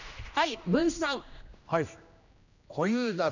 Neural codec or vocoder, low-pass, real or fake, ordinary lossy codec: codec, 16 kHz, 1 kbps, X-Codec, HuBERT features, trained on general audio; 7.2 kHz; fake; none